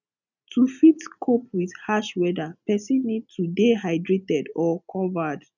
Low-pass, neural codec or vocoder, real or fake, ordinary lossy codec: 7.2 kHz; none; real; none